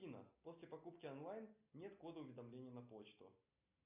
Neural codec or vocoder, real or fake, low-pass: none; real; 3.6 kHz